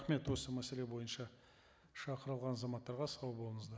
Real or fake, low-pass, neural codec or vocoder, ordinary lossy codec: real; none; none; none